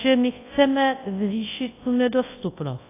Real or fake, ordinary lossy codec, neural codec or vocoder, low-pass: fake; AAC, 16 kbps; codec, 24 kHz, 0.9 kbps, WavTokenizer, large speech release; 3.6 kHz